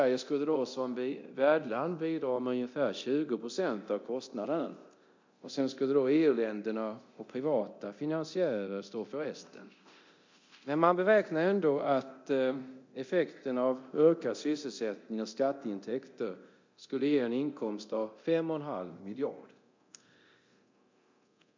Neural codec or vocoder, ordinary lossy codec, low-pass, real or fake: codec, 24 kHz, 0.9 kbps, DualCodec; MP3, 48 kbps; 7.2 kHz; fake